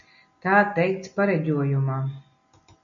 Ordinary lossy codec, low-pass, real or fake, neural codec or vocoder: MP3, 96 kbps; 7.2 kHz; real; none